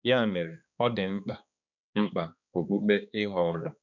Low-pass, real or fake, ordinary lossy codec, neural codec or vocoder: 7.2 kHz; fake; none; codec, 16 kHz, 2 kbps, X-Codec, HuBERT features, trained on balanced general audio